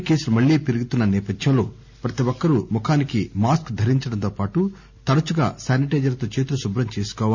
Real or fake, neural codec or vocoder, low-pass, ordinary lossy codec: real; none; 7.2 kHz; none